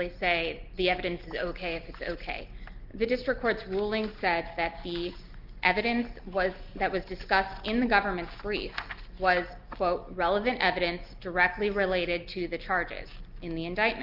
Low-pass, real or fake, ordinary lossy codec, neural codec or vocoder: 5.4 kHz; real; Opus, 24 kbps; none